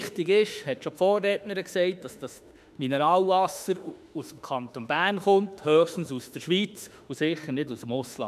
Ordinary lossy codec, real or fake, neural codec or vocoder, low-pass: none; fake; autoencoder, 48 kHz, 32 numbers a frame, DAC-VAE, trained on Japanese speech; 14.4 kHz